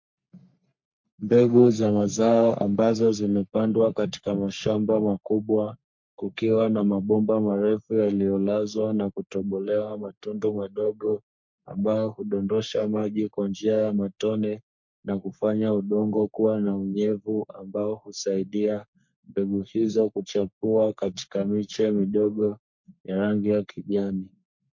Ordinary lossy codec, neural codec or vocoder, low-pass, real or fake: MP3, 48 kbps; codec, 44.1 kHz, 3.4 kbps, Pupu-Codec; 7.2 kHz; fake